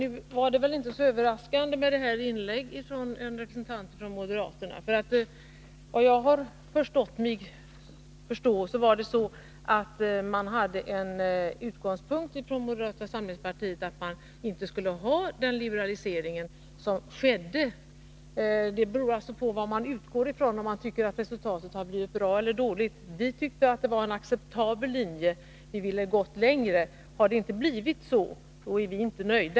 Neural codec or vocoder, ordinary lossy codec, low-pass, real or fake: none; none; none; real